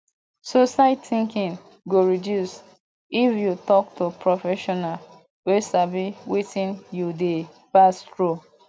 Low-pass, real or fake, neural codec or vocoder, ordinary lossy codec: none; real; none; none